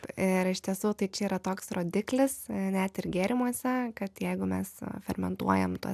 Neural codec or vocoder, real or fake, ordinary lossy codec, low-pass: none; real; AAC, 96 kbps; 14.4 kHz